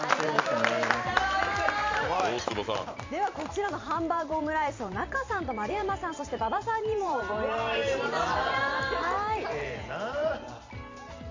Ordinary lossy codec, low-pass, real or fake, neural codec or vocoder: MP3, 48 kbps; 7.2 kHz; fake; vocoder, 44.1 kHz, 128 mel bands every 512 samples, BigVGAN v2